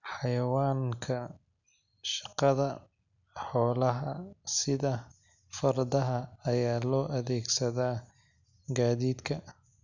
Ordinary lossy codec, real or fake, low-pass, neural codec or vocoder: none; real; 7.2 kHz; none